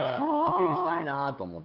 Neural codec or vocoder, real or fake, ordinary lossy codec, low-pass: codec, 16 kHz, 8 kbps, FunCodec, trained on LibriTTS, 25 frames a second; fake; none; 5.4 kHz